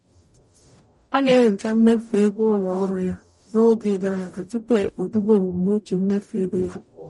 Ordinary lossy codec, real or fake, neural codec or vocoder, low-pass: MP3, 48 kbps; fake; codec, 44.1 kHz, 0.9 kbps, DAC; 19.8 kHz